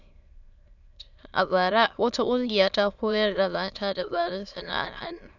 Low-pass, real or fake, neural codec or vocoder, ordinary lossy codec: 7.2 kHz; fake; autoencoder, 22.05 kHz, a latent of 192 numbers a frame, VITS, trained on many speakers; none